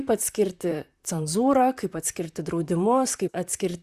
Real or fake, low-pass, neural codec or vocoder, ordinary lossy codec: fake; 14.4 kHz; vocoder, 44.1 kHz, 128 mel bands, Pupu-Vocoder; Opus, 64 kbps